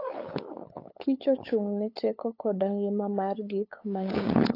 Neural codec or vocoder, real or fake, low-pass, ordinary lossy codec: codec, 16 kHz, 8 kbps, FunCodec, trained on LibriTTS, 25 frames a second; fake; 5.4 kHz; AAC, 32 kbps